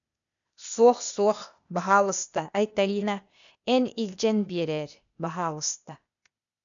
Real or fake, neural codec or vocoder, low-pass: fake; codec, 16 kHz, 0.8 kbps, ZipCodec; 7.2 kHz